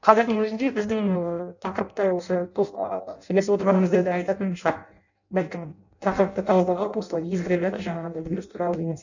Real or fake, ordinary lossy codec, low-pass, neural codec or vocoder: fake; none; 7.2 kHz; codec, 16 kHz in and 24 kHz out, 0.6 kbps, FireRedTTS-2 codec